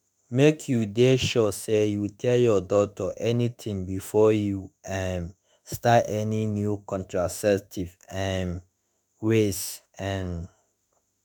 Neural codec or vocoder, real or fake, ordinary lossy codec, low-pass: autoencoder, 48 kHz, 32 numbers a frame, DAC-VAE, trained on Japanese speech; fake; none; none